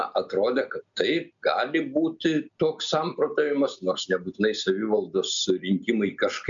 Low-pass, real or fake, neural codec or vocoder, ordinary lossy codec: 7.2 kHz; real; none; MP3, 64 kbps